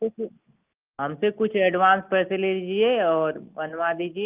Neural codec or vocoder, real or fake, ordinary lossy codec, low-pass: none; real; Opus, 32 kbps; 3.6 kHz